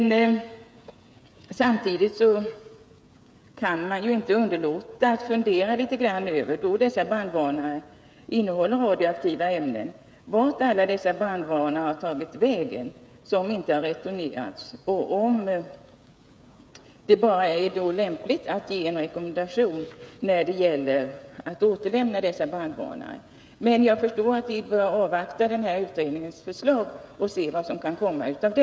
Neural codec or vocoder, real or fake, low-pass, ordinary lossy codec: codec, 16 kHz, 16 kbps, FreqCodec, smaller model; fake; none; none